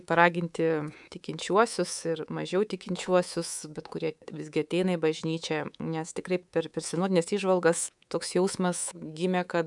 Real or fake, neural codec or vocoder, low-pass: fake; codec, 24 kHz, 3.1 kbps, DualCodec; 10.8 kHz